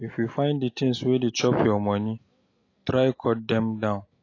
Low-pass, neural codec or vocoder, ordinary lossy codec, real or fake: 7.2 kHz; none; AAC, 32 kbps; real